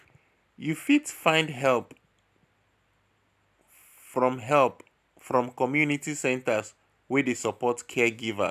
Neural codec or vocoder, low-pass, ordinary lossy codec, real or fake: none; 14.4 kHz; none; real